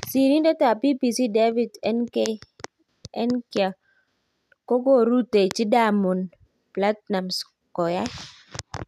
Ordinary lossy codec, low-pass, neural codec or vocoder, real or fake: none; 14.4 kHz; none; real